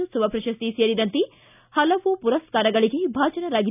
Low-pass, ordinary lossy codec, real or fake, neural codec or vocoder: 3.6 kHz; none; real; none